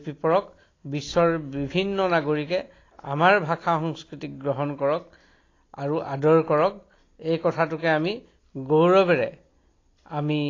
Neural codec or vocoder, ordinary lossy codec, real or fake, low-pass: none; AAC, 32 kbps; real; 7.2 kHz